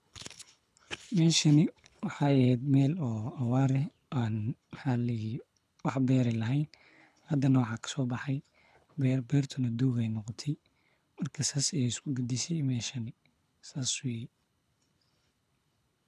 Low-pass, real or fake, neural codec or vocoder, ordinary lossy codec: none; fake; codec, 24 kHz, 6 kbps, HILCodec; none